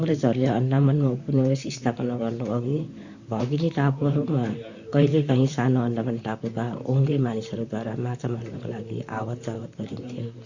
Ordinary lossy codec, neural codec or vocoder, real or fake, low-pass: Opus, 64 kbps; vocoder, 44.1 kHz, 128 mel bands, Pupu-Vocoder; fake; 7.2 kHz